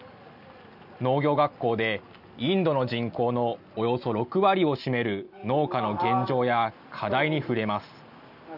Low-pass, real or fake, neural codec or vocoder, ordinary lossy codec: 5.4 kHz; real; none; none